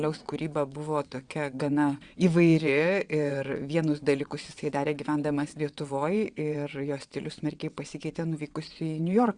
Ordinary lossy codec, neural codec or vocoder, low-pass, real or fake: Opus, 64 kbps; vocoder, 22.05 kHz, 80 mel bands, WaveNeXt; 9.9 kHz; fake